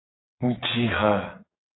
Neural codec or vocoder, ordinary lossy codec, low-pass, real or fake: vocoder, 22.05 kHz, 80 mel bands, WaveNeXt; AAC, 16 kbps; 7.2 kHz; fake